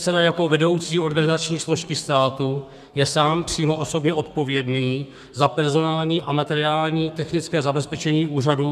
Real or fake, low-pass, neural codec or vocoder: fake; 14.4 kHz; codec, 32 kHz, 1.9 kbps, SNAC